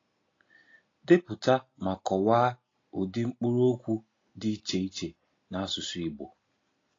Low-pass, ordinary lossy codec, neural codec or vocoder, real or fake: 7.2 kHz; AAC, 32 kbps; none; real